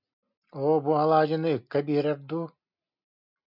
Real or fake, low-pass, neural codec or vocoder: real; 5.4 kHz; none